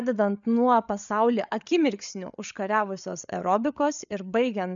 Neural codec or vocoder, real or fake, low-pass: codec, 16 kHz, 8 kbps, FreqCodec, larger model; fake; 7.2 kHz